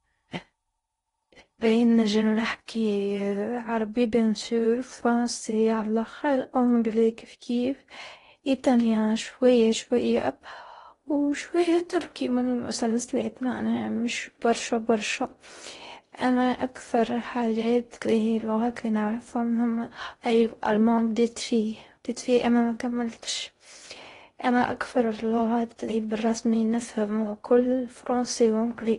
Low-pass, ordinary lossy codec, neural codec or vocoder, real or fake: 10.8 kHz; AAC, 32 kbps; codec, 16 kHz in and 24 kHz out, 0.6 kbps, FocalCodec, streaming, 2048 codes; fake